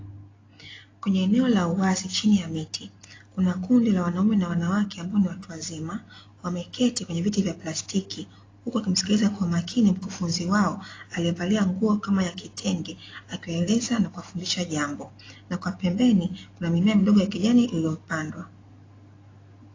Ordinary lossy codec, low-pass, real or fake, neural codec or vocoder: AAC, 32 kbps; 7.2 kHz; real; none